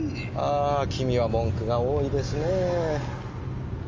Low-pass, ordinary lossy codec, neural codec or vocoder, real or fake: 7.2 kHz; Opus, 32 kbps; none; real